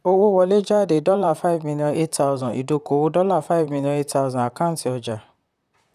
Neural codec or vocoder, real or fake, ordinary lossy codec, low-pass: vocoder, 44.1 kHz, 128 mel bands, Pupu-Vocoder; fake; none; 14.4 kHz